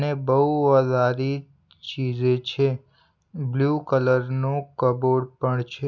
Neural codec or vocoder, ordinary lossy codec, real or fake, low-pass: none; none; real; 7.2 kHz